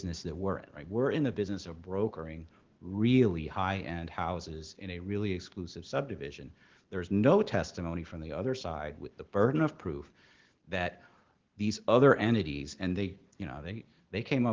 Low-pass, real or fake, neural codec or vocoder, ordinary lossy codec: 7.2 kHz; real; none; Opus, 16 kbps